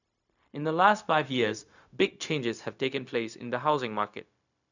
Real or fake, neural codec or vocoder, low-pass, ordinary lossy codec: fake; codec, 16 kHz, 0.4 kbps, LongCat-Audio-Codec; 7.2 kHz; none